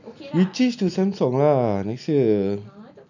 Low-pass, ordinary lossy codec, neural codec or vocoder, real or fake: 7.2 kHz; none; none; real